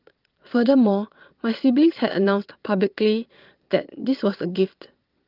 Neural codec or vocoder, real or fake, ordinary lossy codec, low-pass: vocoder, 22.05 kHz, 80 mel bands, Vocos; fake; Opus, 32 kbps; 5.4 kHz